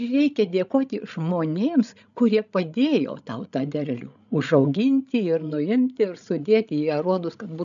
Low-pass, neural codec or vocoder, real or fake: 7.2 kHz; codec, 16 kHz, 16 kbps, FreqCodec, larger model; fake